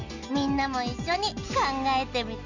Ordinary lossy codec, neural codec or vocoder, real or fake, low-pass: none; none; real; 7.2 kHz